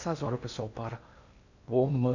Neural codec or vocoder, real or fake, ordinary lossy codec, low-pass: codec, 16 kHz in and 24 kHz out, 0.8 kbps, FocalCodec, streaming, 65536 codes; fake; none; 7.2 kHz